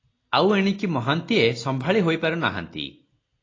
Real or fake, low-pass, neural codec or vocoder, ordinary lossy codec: real; 7.2 kHz; none; AAC, 32 kbps